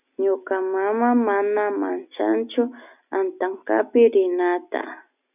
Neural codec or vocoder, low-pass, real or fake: none; 3.6 kHz; real